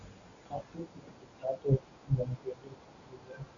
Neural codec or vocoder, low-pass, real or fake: none; 7.2 kHz; real